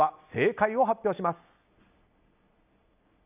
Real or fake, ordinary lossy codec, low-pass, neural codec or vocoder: real; none; 3.6 kHz; none